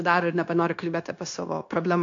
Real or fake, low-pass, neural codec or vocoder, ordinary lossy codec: fake; 7.2 kHz; codec, 16 kHz, 0.9 kbps, LongCat-Audio-Codec; AAC, 48 kbps